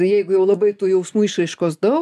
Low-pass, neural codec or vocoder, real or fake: 14.4 kHz; none; real